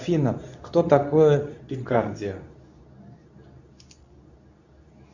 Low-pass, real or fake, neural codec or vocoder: 7.2 kHz; fake; codec, 24 kHz, 0.9 kbps, WavTokenizer, medium speech release version 2